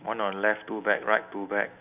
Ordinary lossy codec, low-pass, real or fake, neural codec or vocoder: none; 3.6 kHz; real; none